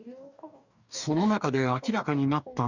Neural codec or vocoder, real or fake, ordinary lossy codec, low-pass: codec, 44.1 kHz, 2.6 kbps, DAC; fake; none; 7.2 kHz